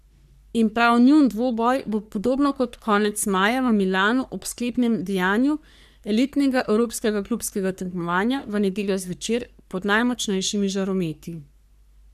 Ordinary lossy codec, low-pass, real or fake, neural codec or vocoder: none; 14.4 kHz; fake; codec, 44.1 kHz, 3.4 kbps, Pupu-Codec